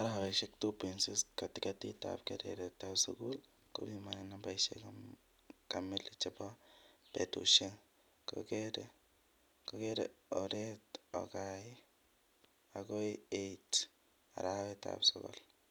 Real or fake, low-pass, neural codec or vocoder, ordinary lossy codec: real; none; none; none